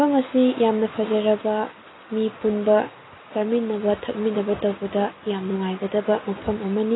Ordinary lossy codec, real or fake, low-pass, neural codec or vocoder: AAC, 16 kbps; real; 7.2 kHz; none